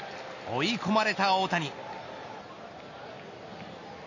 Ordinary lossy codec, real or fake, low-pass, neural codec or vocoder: MP3, 32 kbps; real; 7.2 kHz; none